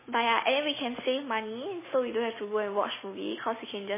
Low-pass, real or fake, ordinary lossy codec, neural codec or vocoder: 3.6 kHz; real; MP3, 16 kbps; none